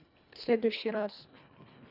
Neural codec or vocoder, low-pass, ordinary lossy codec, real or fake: codec, 24 kHz, 1.5 kbps, HILCodec; 5.4 kHz; none; fake